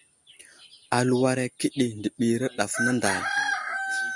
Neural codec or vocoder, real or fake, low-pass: none; real; 10.8 kHz